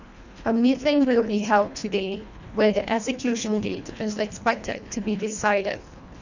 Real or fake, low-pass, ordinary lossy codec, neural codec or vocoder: fake; 7.2 kHz; none; codec, 24 kHz, 1.5 kbps, HILCodec